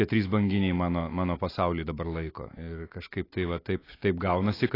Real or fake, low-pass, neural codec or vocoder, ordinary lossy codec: real; 5.4 kHz; none; AAC, 24 kbps